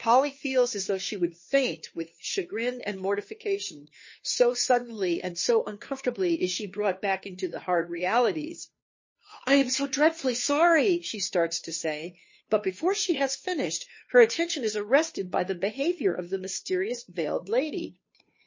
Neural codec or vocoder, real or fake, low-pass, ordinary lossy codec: codec, 16 kHz, 2 kbps, FunCodec, trained on Chinese and English, 25 frames a second; fake; 7.2 kHz; MP3, 32 kbps